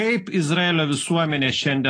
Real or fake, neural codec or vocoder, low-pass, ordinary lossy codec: real; none; 9.9 kHz; AAC, 32 kbps